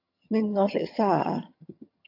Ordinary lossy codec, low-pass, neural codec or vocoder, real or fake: AAC, 48 kbps; 5.4 kHz; vocoder, 22.05 kHz, 80 mel bands, HiFi-GAN; fake